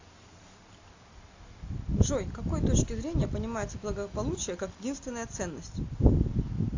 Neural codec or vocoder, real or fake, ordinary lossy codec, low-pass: none; real; AAC, 48 kbps; 7.2 kHz